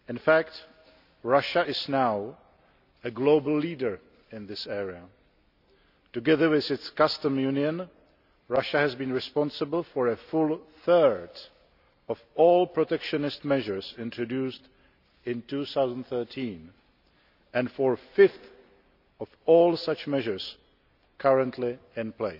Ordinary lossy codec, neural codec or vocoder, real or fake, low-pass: none; none; real; 5.4 kHz